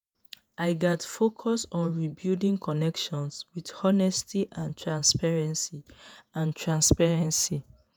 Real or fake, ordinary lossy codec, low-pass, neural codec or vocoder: fake; none; none; vocoder, 48 kHz, 128 mel bands, Vocos